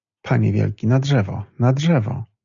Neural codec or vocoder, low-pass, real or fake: none; 7.2 kHz; real